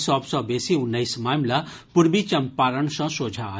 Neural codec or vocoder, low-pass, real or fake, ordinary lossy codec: none; none; real; none